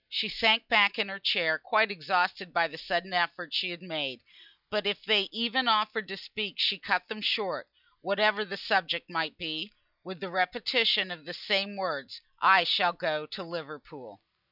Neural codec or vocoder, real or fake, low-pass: none; real; 5.4 kHz